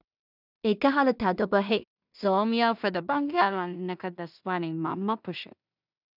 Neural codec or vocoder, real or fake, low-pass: codec, 16 kHz in and 24 kHz out, 0.4 kbps, LongCat-Audio-Codec, two codebook decoder; fake; 5.4 kHz